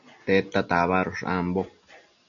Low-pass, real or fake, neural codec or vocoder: 7.2 kHz; real; none